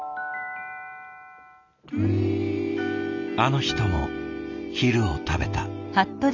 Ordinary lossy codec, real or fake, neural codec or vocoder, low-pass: none; real; none; 7.2 kHz